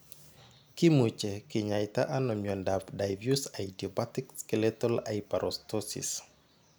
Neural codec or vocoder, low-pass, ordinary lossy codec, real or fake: none; none; none; real